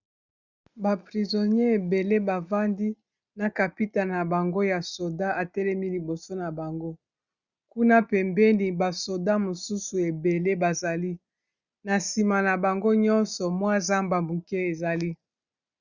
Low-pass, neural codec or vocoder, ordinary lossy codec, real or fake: 7.2 kHz; none; Opus, 64 kbps; real